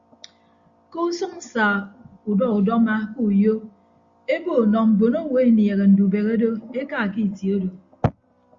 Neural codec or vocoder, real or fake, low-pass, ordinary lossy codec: none; real; 7.2 kHz; Opus, 64 kbps